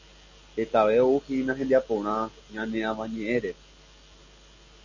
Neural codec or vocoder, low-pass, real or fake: none; 7.2 kHz; real